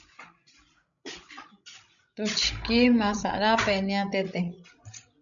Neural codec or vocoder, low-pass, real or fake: codec, 16 kHz, 16 kbps, FreqCodec, larger model; 7.2 kHz; fake